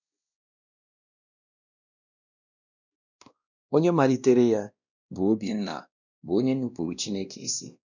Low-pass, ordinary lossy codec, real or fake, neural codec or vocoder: 7.2 kHz; none; fake; codec, 16 kHz, 1 kbps, X-Codec, WavLM features, trained on Multilingual LibriSpeech